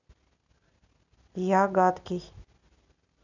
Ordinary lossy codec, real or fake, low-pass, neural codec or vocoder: none; real; 7.2 kHz; none